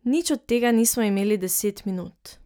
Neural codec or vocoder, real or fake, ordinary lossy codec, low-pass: none; real; none; none